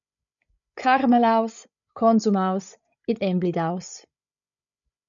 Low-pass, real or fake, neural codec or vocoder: 7.2 kHz; fake; codec, 16 kHz, 8 kbps, FreqCodec, larger model